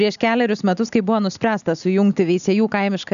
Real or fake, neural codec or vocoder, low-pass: real; none; 7.2 kHz